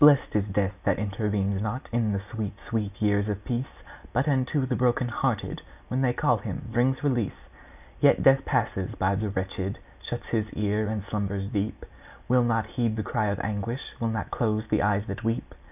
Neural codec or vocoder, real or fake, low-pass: none; real; 3.6 kHz